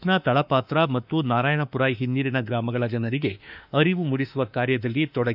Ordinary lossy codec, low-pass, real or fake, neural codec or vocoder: none; 5.4 kHz; fake; autoencoder, 48 kHz, 32 numbers a frame, DAC-VAE, trained on Japanese speech